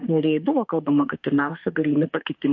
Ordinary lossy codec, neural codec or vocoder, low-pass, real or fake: MP3, 48 kbps; autoencoder, 48 kHz, 32 numbers a frame, DAC-VAE, trained on Japanese speech; 7.2 kHz; fake